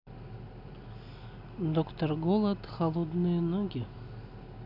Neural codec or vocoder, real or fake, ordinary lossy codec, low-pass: none; real; none; 5.4 kHz